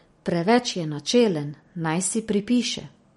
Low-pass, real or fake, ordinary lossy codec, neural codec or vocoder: 19.8 kHz; real; MP3, 48 kbps; none